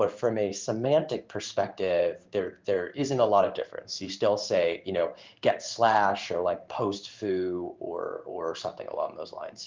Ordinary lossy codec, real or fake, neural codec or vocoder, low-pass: Opus, 24 kbps; fake; codec, 16 kHz in and 24 kHz out, 1 kbps, XY-Tokenizer; 7.2 kHz